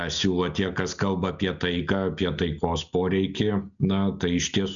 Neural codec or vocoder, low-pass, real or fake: none; 7.2 kHz; real